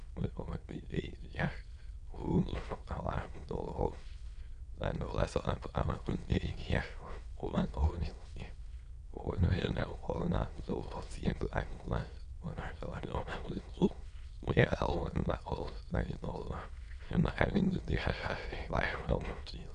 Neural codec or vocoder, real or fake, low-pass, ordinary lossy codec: autoencoder, 22.05 kHz, a latent of 192 numbers a frame, VITS, trained on many speakers; fake; 9.9 kHz; none